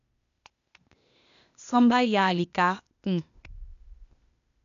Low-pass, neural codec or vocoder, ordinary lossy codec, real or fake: 7.2 kHz; codec, 16 kHz, 0.8 kbps, ZipCodec; none; fake